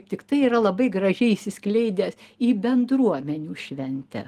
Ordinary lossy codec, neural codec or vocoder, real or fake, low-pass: Opus, 24 kbps; none; real; 14.4 kHz